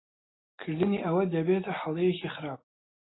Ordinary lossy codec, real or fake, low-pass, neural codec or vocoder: AAC, 16 kbps; real; 7.2 kHz; none